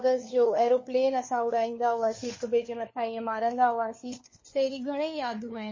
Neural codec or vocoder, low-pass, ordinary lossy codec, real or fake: codec, 16 kHz, 4 kbps, FunCodec, trained on LibriTTS, 50 frames a second; 7.2 kHz; MP3, 32 kbps; fake